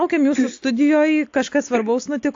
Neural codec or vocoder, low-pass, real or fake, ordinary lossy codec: none; 7.2 kHz; real; AAC, 48 kbps